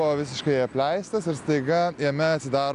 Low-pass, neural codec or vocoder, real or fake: 10.8 kHz; none; real